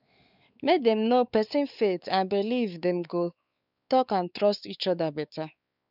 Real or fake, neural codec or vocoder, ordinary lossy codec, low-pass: fake; codec, 16 kHz, 4 kbps, X-Codec, WavLM features, trained on Multilingual LibriSpeech; none; 5.4 kHz